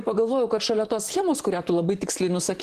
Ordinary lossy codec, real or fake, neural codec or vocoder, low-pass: Opus, 16 kbps; fake; vocoder, 44.1 kHz, 128 mel bands, Pupu-Vocoder; 14.4 kHz